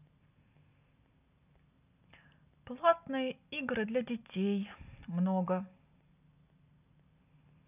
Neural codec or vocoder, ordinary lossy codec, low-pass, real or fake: none; none; 3.6 kHz; real